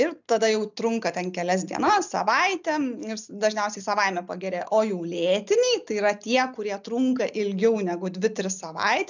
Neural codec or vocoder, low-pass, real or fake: none; 7.2 kHz; real